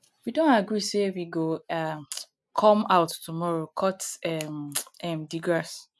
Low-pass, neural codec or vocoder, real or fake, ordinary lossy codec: none; none; real; none